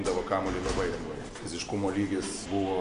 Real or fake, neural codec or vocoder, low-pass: real; none; 10.8 kHz